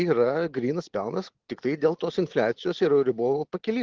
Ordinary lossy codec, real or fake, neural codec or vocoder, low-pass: Opus, 16 kbps; real; none; 7.2 kHz